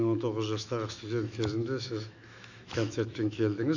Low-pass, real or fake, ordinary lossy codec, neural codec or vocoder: 7.2 kHz; fake; none; autoencoder, 48 kHz, 128 numbers a frame, DAC-VAE, trained on Japanese speech